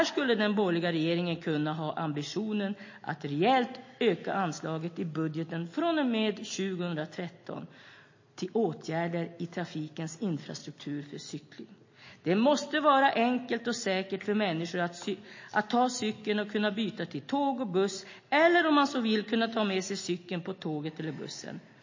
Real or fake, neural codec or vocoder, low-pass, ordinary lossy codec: real; none; 7.2 kHz; MP3, 32 kbps